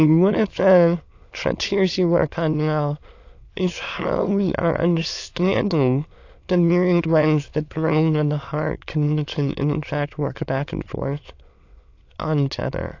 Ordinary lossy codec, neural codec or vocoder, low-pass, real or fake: AAC, 48 kbps; autoencoder, 22.05 kHz, a latent of 192 numbers a frame, VITS, trained on many speakers; 7.2 kHz; fake